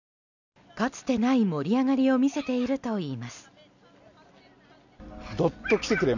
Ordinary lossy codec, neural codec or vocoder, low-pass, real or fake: none; none; 7.2 kHz; real